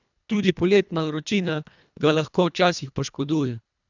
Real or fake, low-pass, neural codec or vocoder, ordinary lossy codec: fake; 7.2 kHz; codec, 24 kHz, 1.5 kbps, HILCodec; none